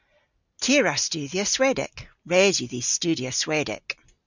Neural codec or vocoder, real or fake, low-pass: none; real; 7.2 kHz